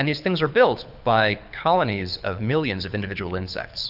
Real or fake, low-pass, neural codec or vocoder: fake; 5.4 kHz; codec, 24 kHz, 6 kbps, HILCodec